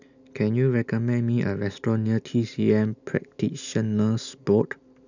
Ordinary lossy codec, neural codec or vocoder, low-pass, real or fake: none; none; 7.2 kHz; real